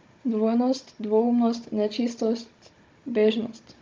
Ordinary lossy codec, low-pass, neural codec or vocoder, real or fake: Opus, 24 kbps; 7.2 kHz; codec, 16 kHz, 16 kbps, FunCodec, trained on Chinese and English, 50 frames a second; fake